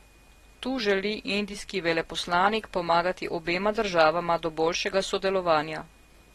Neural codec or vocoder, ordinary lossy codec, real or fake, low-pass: none; AAC, 32 kbps; real; 19.8 kHz